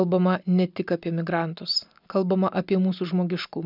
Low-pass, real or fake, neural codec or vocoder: 5.4 kHz; real; none